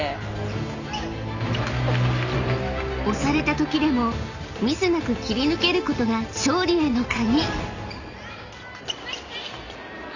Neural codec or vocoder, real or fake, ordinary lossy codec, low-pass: none; real; none; 7.2 kHz